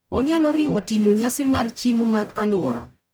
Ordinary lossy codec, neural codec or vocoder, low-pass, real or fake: none; codec, 44.1 kHz, 0.9 kbps, DAC; none; fake